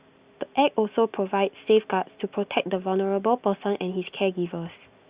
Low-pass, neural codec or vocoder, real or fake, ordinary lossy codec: 3.6 kHz; none; real; Opus, 64 kbps